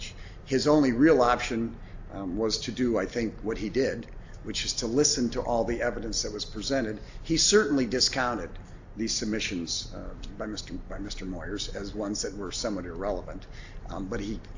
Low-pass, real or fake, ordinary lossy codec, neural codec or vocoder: 7.2 kHz; real; AAC, 48 kbps; none